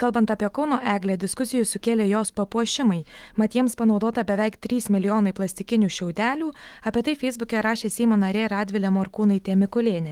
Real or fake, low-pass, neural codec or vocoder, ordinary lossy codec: fake; 19.8 kHz; autoencoder, 48 kHz, 128 numbers a frame, DAC-VAE, trained on Japanese speech; Opus, 24 kbps